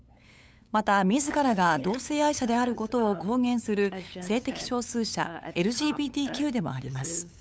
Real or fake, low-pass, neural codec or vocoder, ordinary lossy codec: fake; none; codec, 16 kHz, 8 kbps, FunCodec, trained on LibriTTS, 25 frames a second; none